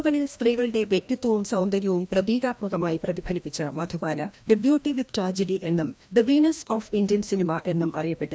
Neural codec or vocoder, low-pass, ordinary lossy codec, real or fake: codec, 16 kHz, 1 kbps, FreqCodec, larger model; none; none; fake